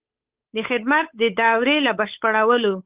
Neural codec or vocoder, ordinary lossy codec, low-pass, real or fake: codec, 16 kHz, 8 kbps, FunCodec, trained on Chinese and English, 25 frames a second; Opus, 32 kbps; 3.6 kHz; fake